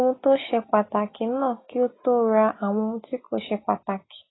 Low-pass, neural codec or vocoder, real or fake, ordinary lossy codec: 7.2 kHz; none; real; AAC, 16 kbps